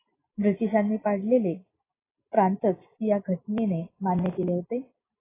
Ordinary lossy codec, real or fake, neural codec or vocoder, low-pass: AAC, 16 kbps; real; none; 3.6 kHz